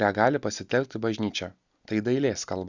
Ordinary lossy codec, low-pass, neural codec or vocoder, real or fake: Opus, 64 kbps; 7.2 kHz; none; real